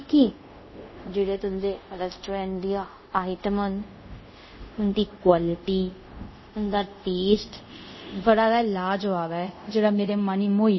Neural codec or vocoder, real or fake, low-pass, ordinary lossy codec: codec, 24 kHz, 0.5 kbps, DualCodec; fake; 7.2 kHz; MP3, 24 kbps